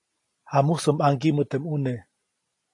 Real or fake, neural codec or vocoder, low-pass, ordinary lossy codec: real; none; 10.8 kHz; AAC, 48 kbps